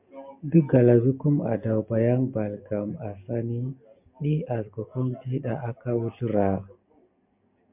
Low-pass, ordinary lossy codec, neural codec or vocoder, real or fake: 3.6 kHz; MP3, 32 kbps; none; real